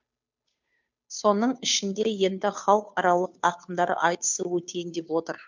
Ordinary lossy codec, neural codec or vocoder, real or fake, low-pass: MP3, 64 kbps; codec, 16 kHz, 2 kbps, FunCodec, trained on Chinese and English, 25 frames a second; fake; 7.2 kHz